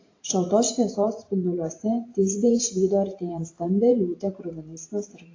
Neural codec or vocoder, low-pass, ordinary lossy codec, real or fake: vocoder, 44.1 kHz, 128 mel bands every 512 samples, BigVGAN v2; 7.2 kHz; AAC, 32 kbps; fake